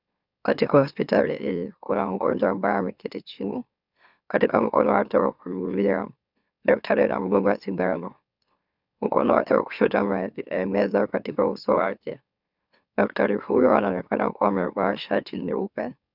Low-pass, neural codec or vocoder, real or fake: 5.4 kHz; autoencoder, 44.1 kHz, a latent of 192 numbers a frame, MeloTTS; fake